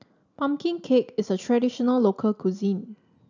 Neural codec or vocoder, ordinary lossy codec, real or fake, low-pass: none; none; real; 7.2 kHz